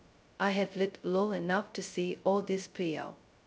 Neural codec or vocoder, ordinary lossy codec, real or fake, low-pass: codec, 16 kHz, 0.2 kbps, FocalCodec; none; fake; none